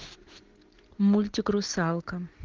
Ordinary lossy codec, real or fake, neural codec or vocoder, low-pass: Opus, 16 kbps; real; none; 7.2 kHz